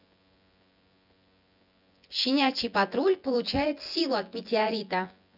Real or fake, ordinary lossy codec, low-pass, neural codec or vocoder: fake; none; 5.4 kHz; vocoder, 24 kHz, 100 mel bands, Vocos